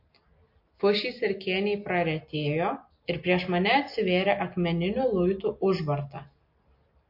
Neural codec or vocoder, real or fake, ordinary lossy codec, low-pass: none; real; MP3, 32 kbps; 5.4 kHz